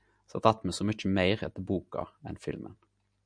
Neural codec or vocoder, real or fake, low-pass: none; real; 9.9 kHz